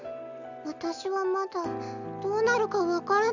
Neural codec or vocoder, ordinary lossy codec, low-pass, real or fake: none; none; 7.2 kHz; real